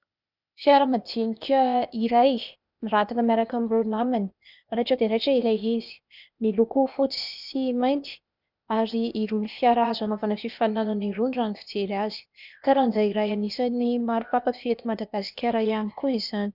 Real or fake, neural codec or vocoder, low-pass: fake; codec, 16 kHz, 0.8 kbps, ZipCodec; 5.4 kHz